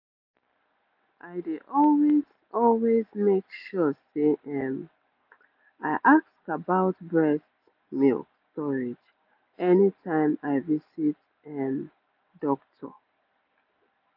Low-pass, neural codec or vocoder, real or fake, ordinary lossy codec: 5.4 kHz; none; real; AAC, 48 kbps